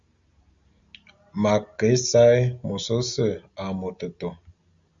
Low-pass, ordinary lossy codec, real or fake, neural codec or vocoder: 7.2 kHz; Opus, 64 kbps; real; none